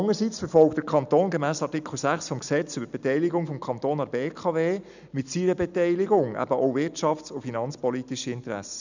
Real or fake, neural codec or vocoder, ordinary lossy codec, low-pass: real; none; none; 7.2 kHz